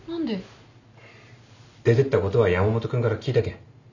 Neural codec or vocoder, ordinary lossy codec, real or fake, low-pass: none; none; real; 7.2 kHz